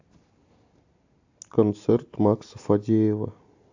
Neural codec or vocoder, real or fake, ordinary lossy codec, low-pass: none; real; AAC, 48 kbps; 7.2 kHz